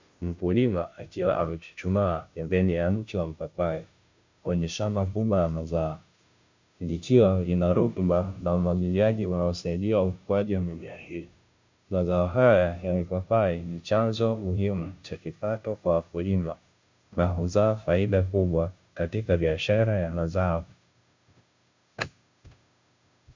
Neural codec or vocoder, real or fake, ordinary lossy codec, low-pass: codec, 16 kHz, 0.5 kbps, FunCodec, trained on Chinese and English, 25 frames a second; fake; MP3, 64 kbps; 7.2 kHz